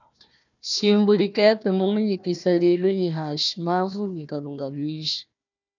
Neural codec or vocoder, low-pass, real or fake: codec, 16 kHz, 1 kbps, FunCodec, trained on Chinese and English, 50 frames a second; 7.2 kHz; fake